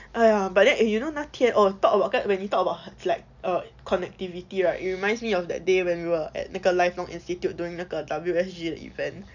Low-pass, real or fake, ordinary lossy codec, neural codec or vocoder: 7.2 kHz; real; none; none